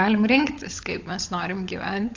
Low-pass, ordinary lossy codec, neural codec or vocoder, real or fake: 7.2 kHz; AAC, 48 kbps; codec, 16 kHz, 16 kbps, FunCodec, trained on LibriTTS, 50 frames a second; fake